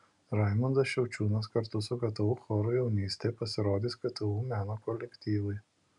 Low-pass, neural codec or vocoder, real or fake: 10.8 kHz; none; real